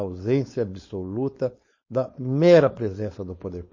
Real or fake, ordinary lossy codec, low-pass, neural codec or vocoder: fake; MP3, 32 kbps; 7.2 kHz; codec, 16 kHz, 4.8 kbps, FACodec